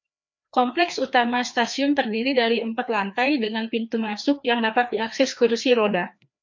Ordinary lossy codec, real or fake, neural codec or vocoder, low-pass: MP3, 64 kbps; fake; codec, 16 kHz, 2 kbps, FreqCodec, larger model; 7.2 kHz